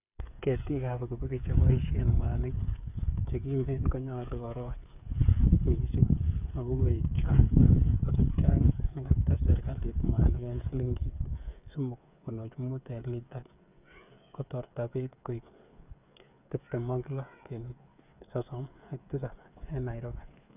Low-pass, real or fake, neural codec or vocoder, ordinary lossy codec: 3.6 kHz; fake; codec, 16 kHz, 8 kbps, FreqCodec, smaller model; none